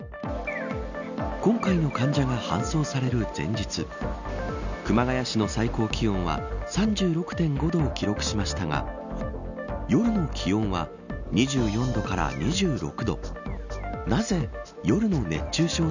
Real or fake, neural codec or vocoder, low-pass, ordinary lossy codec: real; none; 7.2 kHz; none